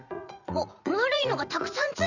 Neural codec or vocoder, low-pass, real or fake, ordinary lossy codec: vocoder, 44.1 kHz, 80 mel bands, Vocos; 7.2 kHz; fake; none